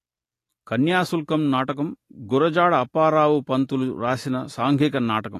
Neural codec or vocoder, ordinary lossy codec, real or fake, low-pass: none; AAC, 48 kbps; real; 14.4 kHz